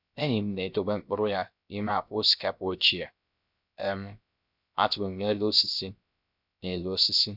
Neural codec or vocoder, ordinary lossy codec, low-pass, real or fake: codec, 16 kHz, 0.3 kbps, FocalCodec; none; 5.4 kHz; fake